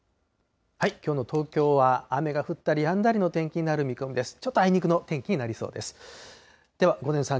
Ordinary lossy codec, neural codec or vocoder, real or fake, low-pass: none; none; real; none